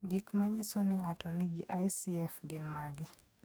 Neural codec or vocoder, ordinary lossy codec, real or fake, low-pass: codec, 44.1 kHz, 2.6 kbps, DAC; none; fake; none